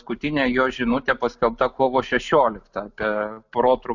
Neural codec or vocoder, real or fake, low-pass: none; real; 7.2 kHz